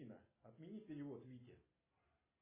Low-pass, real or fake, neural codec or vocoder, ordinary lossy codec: 3.6 kHz; real; none; MP3, 16 kbps